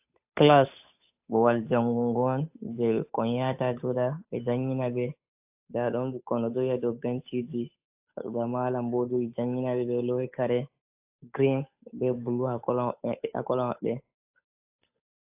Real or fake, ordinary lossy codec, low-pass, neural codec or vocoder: fake; AAC, 32 kbps; 3.6 kHz; codec, 16 kHz, 8 kbps, FunCodec, trained on Chinese and English, 25 frames a second